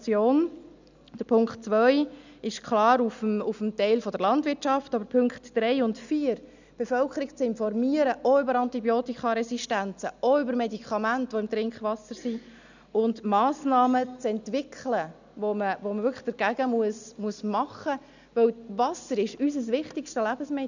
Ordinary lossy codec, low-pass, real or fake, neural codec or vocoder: none; 7.2 kHz; real; none